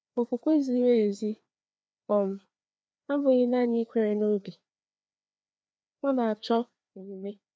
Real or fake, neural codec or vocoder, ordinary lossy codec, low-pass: fake; codec, 16 kHz, 2 kbps, FreqCodec, larger model; none; none